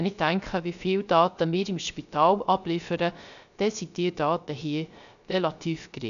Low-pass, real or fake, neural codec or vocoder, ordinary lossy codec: 7.2 kHz; fake; codec, 16 kHz, 0.3 kbps, FocalCodec; none